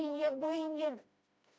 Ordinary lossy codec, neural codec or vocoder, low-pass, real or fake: none; codec, 16 kHz, 1 kbps, FreqCodec, smaller model; none; fake